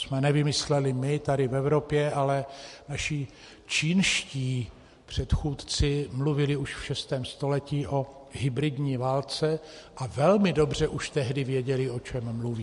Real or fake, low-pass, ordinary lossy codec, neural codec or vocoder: real; 14.4 kHz; MP3, 48 kbps; none